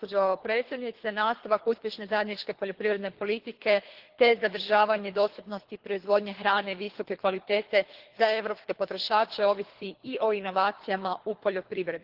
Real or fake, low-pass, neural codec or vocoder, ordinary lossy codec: fake; 5.4 kHz; codec, 24 kHz, 3 kbps, HILCodec; Opus, 16 kbps